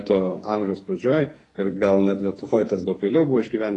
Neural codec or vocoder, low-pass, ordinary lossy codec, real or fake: codec, 44.1 kHz, 2.6 kbps, SNAC; 10.8 kHz; AAC, 32 kbps; fake